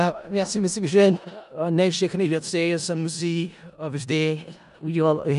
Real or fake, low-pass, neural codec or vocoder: fake; 10.8 kHz; codec, 16 kHz in and 24 kHz out, 0.4 kbps, LongCat-Audio-Codec, four codebook decoder